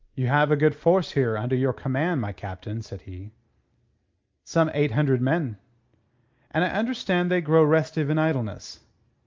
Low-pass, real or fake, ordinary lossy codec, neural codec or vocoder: 7.2 kHz; real; Opus, 24 kbps; none